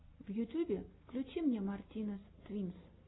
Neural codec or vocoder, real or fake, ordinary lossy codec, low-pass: none; real; AAC, 16 kbps; 7.2 kHz